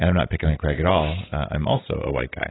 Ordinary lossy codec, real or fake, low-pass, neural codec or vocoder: AAC, 16 kbps; real; 7.2 kHz; none